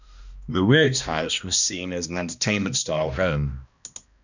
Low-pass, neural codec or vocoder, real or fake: 7.2 kHz; codec, 16 kHz, 1 kbps, X-Codec, HuBERT features, trained on balanced general audio; fake